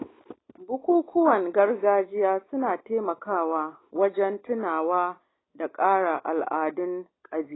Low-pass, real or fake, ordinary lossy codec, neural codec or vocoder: 7.2 kHz; real; AAC, 16 kbps; none